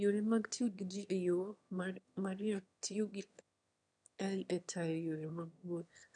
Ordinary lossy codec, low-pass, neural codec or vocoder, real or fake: none; 9.9 kHz; autoencoder, 22.05 kHz, a latent of 192 numbers a frame, VITS, trained on one speaker; fake